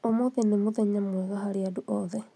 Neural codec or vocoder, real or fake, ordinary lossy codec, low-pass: none; real; none; none